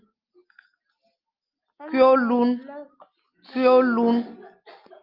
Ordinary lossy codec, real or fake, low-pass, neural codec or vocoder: Opus, 24 kbps; real; 5.4 kHz; none